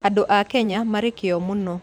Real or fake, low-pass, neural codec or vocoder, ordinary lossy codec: fake; 19.8 kHz; vocoder, 44.1 kHz, 128 mel bands every 512 samples, BigVGAN v2; none